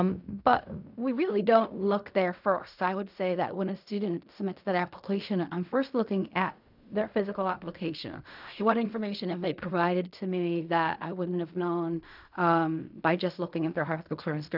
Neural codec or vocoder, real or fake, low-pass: codec, 16 kHz in and 24 kHz out, 0.4 kbps, LongCat-Audio-Codec, fine tuned four codebook decoder; fake; 5.4 kHz